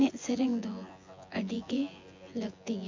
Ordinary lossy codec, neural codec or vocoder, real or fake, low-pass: MP3, 48 kbps; vocoder, 24 kHz, 100 mel bands, Vocos; fake; 7.2 kHz